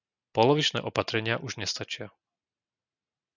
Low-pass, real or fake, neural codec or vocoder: 7.2 kHz; real; none